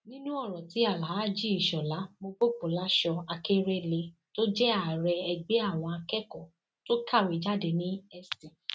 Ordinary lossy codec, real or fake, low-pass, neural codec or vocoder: none; real; none; none